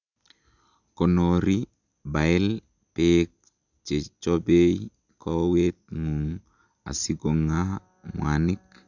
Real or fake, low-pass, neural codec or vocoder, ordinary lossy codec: real; 7.2 kHz; none; none